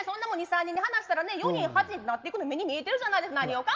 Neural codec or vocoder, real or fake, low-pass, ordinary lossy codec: none; real; 7.2 kHz; Opus, 24 kbps